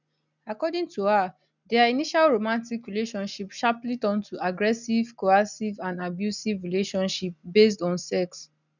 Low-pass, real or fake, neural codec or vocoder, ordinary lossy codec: 7.2 kHz; real; none; none